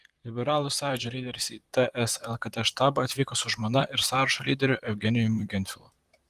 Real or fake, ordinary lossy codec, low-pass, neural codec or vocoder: fake; Opus, 24 kbps; 14.4 kHz; vocoder, 44.1 kHz, 128 mel bands, Pupu-Vocoder